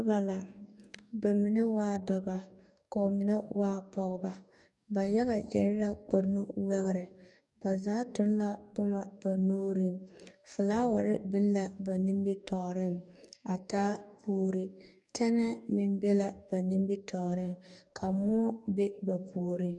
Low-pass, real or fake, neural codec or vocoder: 10.8 kHz; fake; codec, 44.1 kHz, 2.6 kbps, DAC